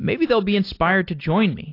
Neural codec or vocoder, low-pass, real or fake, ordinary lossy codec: none; 5.4 kHz; real; AAC, 32 kbps